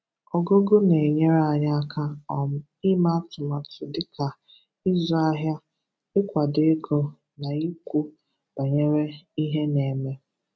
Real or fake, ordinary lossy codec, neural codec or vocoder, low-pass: real; none; none; none